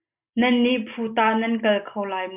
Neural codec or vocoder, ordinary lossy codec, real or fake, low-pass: none; AAC, 32 kbps; real; 3.6 kHz